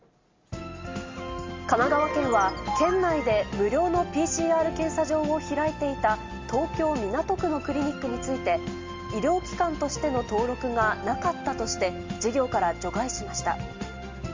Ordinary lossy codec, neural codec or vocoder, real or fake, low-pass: Opus, 32 kbps; none; real; 7.2 kHz